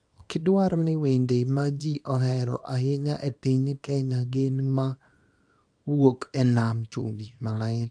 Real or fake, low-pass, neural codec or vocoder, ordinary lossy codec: fake; 9.9 kHz; codec, 24 kHz, 0.9 kbps, WavTokenizer, small release; AAC, 64 kbps